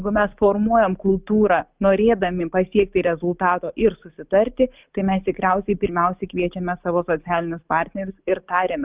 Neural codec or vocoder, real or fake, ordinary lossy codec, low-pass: none; real; Opus, 24 kbps; 3.6 kHz